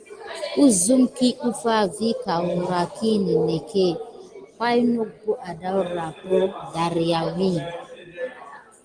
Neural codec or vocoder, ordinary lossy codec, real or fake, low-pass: none; Opus, 24 kbps; real; 9.9 kHz